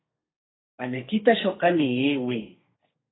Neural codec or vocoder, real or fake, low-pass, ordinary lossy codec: codec, 32 kHz, 1.9 kbps, SNAC; fake; 7.2 kHz; AAC, 16 kbps